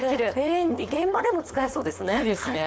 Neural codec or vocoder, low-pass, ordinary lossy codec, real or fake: codec, 16 kHz, 4.8 kbps, FACodec; none; none; fake